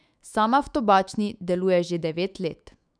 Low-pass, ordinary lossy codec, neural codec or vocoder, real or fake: 9.9 kHz; none; autoencoder, 48 kHz, 128 numbers a frame, DAC-VAE, trained on Japanese speech; fake